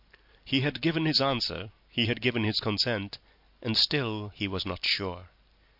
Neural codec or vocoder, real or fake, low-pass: none; real; 5.4 kHz